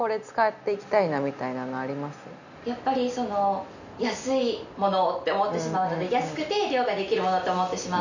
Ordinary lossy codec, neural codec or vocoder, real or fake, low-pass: none; none; real; 7.2 kHz